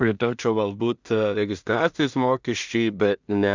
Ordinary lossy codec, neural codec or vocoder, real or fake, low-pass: Opus, 64 kbps; codec, 16 kHz in and 24 kHz out, 0.4 kbps, LongCat-Audio-Codec, two codebook decoder; fake; 7.2 kHz